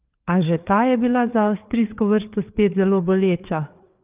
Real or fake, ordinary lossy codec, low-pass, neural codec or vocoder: fake; Opus, 32 kbps; 3.6 kHz; codec, 16 kHz, 4 kbps, FreqCodec, larger model